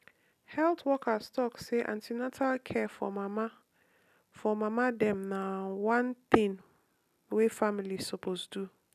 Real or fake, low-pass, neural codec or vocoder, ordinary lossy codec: real; 14.4 kHz; none; none